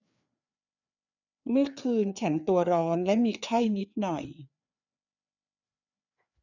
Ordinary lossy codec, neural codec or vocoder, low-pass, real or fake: none; codec, 16 kHz, 4 kbps, FreqCodec, larger model; 7.2 kHz; fake